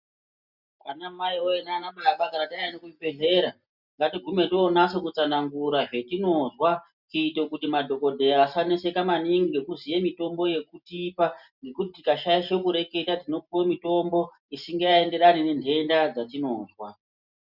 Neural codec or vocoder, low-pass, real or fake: none; 5.4 kHz; real